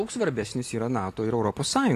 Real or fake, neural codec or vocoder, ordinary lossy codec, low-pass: real; none; AAC, 48 kbps; 14.4 kHz